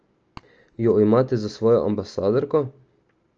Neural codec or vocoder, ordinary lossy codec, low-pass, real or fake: none; Opus, 24 kbps; 7.2 kHz; real